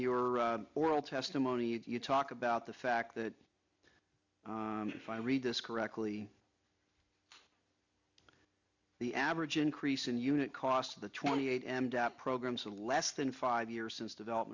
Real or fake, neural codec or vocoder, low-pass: real; none; 7.2 kHz